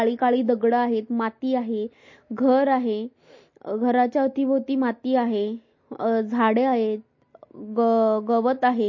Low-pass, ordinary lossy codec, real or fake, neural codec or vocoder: 7.2 kHz; MP3, 32 kbps; real; none